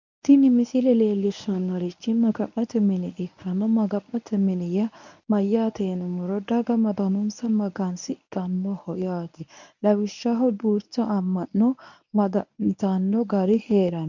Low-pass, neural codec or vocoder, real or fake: 7.2 kHz; codec, 24 kHz, 0.9 kbps, WavTokenizer, medium speech release version 1; fake